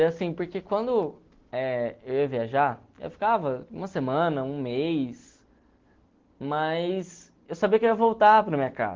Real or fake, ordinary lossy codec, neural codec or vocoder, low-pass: real; Opus, 16 kbps; none; 7.2 kHz